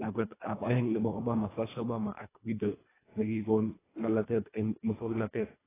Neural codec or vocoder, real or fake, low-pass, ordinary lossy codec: codec, 24 kHz, 1.5 kbps, HILCodec; fake; 3.6 kHz; AAC, 16 kbps